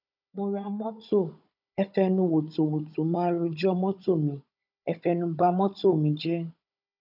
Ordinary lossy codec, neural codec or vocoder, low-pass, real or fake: none; codec, 16 kHz, 16 kbps, FunCodec, trained on Chinese and English, 50 frames a second; 5.4 kHz; fake